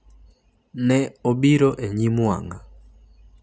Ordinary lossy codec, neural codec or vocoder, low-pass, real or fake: none; none; none; real